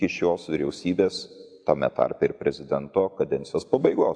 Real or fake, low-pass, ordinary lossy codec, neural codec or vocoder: real; 9.9 kHz; AAC, 64 kbps; none